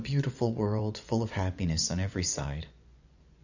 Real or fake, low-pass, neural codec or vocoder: fake; 7.2 kHz; vocoder, 24 kHz, 100 mel bands, Vocos